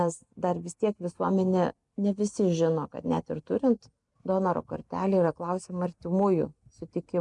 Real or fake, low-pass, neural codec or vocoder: real; 10.8 kHz; none